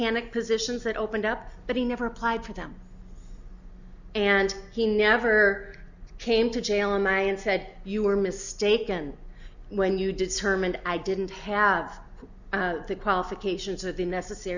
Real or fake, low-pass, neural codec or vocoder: real; 7.2 kHz; none